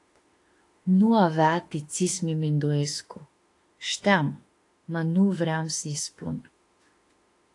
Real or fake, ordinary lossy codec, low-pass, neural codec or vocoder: fake; AAC, 48 kbps; 10.8 kHz; autoencoder, 48 kHz, 32 numbers a frame, DAC-VAE, trained on Japanese speech